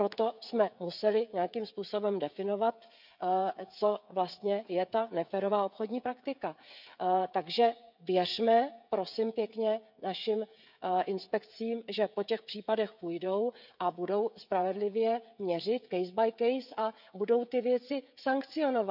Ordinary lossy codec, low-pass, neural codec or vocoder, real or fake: none; 5.4 kHz; codec, 16 kHz, 16 kbps, FreqCodec, smaller model; fake